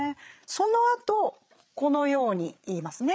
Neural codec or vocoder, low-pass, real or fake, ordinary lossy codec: codec, 16 kHz, 8 kbps, FreqCodec, larger model; none; fake; none